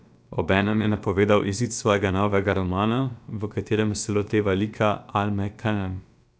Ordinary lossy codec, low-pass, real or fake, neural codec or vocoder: none; none; fake; codec, 16 kHz, about 1 kbps, DyCAST, with the encoder's durations